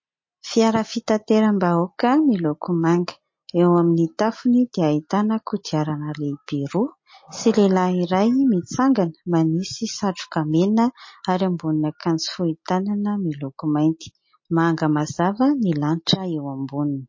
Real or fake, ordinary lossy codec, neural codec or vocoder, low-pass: real; MP3, 32 kbps; none; 7.2 kHz